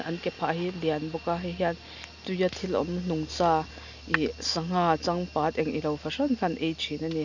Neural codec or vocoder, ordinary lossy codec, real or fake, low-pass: none; none; real; 7.2 kHz